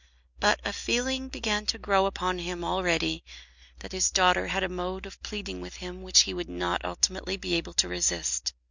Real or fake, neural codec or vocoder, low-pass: real; none; 7.2 kHz